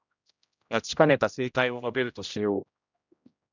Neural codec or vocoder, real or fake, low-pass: codec, 16 kHz, 0.5 kbps, X-Codec, HuBERT features, trained on general audio; fake; 7.2 kHz